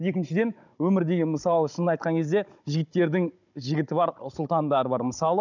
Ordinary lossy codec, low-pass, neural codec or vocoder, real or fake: none; 7.2 kHz; codec, 16 kHz, 16 kbps, FunCodec, trained on Chinese and English, 50 frames a second; fake